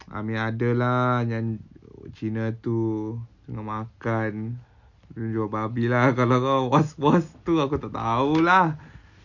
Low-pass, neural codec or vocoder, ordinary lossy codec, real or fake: 7.2 kHz; none; none; real